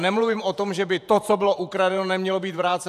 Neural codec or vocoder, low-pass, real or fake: vocoder, 48 kHz, 128 mel bands, Vocos; 14.4 kHz; fake